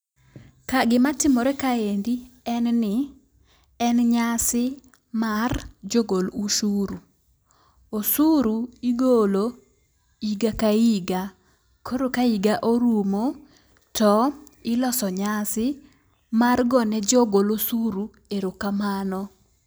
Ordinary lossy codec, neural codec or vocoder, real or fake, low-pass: none; none; real; none